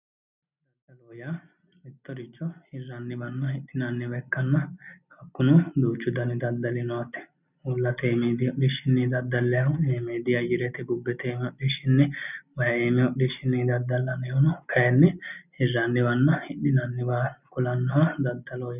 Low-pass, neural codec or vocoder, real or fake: 3.6 kHz; none; real